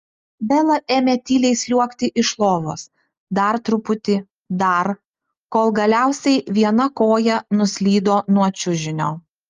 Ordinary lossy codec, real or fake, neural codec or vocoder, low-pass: Opus, 32 kbps; real; none; 7.2 kHz